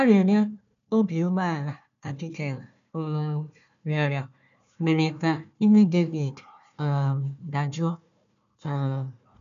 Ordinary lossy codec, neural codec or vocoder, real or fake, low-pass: none; codec, 16 kHz, 1 kbps, FunCodec, trained on Chinese and English, 50 frames a second; fake; 7.2 kHz